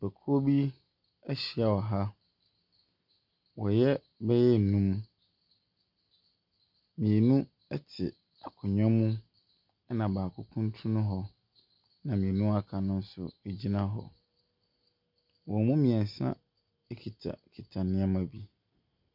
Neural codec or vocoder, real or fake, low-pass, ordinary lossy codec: none; real; 5.4 kHz; MP3, 48 kbps